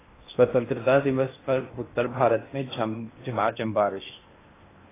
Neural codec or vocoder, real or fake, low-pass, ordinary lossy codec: codec, 16 kHz in and 24 kHz out, 0.8 kbps, FocalCodec, streaming, 65536 codes; fake; 3.6 kHz; AAC, 16 kbps